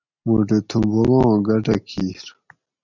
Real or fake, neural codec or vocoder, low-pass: real; none; 7.2 kHz